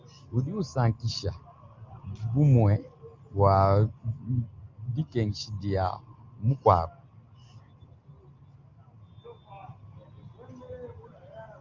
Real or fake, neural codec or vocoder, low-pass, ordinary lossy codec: real; none; 7.2 kHz; Opus, 24 kbps